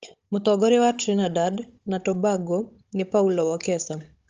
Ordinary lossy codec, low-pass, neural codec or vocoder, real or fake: Opus, 32 kbps; 7.2 kHz; codec, 16 kHz, 16 kbps, FunCodec, trained on Chinese and English, 50 frames a second; fake